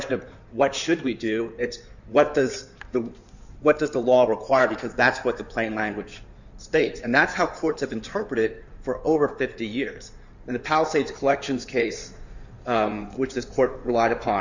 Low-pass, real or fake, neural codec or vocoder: 7.2 kHz; fake; codec, 16 kHz in and 24 kHz out, 2.2 kbps, FireRedTTS-2 codec